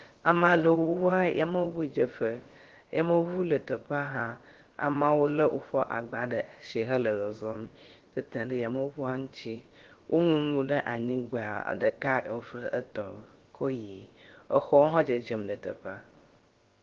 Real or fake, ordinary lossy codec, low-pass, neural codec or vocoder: fake; Opus, 16 kbps; 7.2 kHz; codec, 16 kHz, about 1 kbps, DyCAST, with the encoder's durations